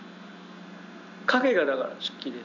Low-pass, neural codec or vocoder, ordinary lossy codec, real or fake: 7.2 kHz; none; none; real